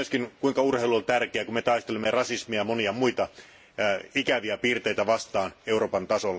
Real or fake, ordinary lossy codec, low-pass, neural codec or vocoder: real; none; none; none